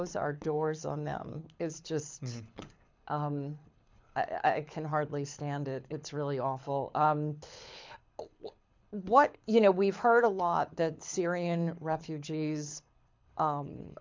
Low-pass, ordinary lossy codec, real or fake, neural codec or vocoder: 7.2 kHz; MP3, 64 kbps; fake; codec, 24 kHz, 6 kbps, HILCodec